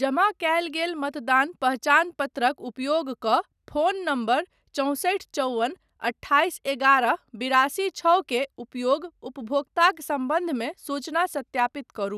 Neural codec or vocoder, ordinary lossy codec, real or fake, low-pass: none; none; real; 14.4 kHz